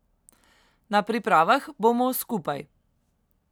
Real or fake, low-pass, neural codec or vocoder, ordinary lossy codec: real; none; none; none